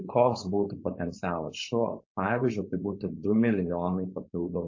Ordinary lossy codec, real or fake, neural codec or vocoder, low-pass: MP3, 32 kbps; fake; codec, 16 kHz, 4.8 kbps, FACodec; 7.2 kHz